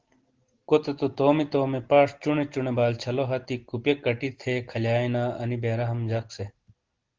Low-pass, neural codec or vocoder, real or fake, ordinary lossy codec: 7.2 kHz; none; real; Opus, 16 kbps